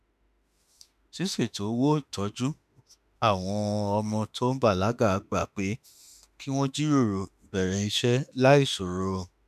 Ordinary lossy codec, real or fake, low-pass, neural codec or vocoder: none; fake; 14.4 kHz; autoencoder, 48 kHz, 32 numbers a frame, DAC-VAE, trained on Japanese speech